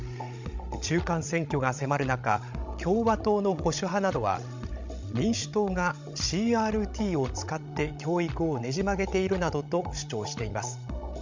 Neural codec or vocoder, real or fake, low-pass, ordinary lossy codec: codec, 16 kHz, 16 kbps, FreqCodec, larger model; fake; 7.2 kHz; MP3, 64 kbps